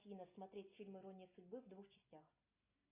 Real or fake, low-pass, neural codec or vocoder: real; 3.6 kHz; none